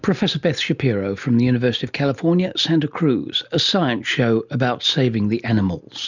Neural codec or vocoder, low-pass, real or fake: none; 7.2 kHz; real